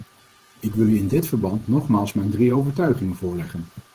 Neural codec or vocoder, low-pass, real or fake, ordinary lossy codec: vocoder, 48 kHz, 128 mel bands, Vocos; 14.4 kHz; fake; Opus, 16 kbps